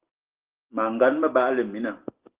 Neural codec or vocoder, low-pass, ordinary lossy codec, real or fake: none; 3.6 kHz; Opus, 16 kbps; real